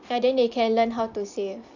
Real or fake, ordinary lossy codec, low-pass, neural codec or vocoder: real; none; 7.2 kHz; none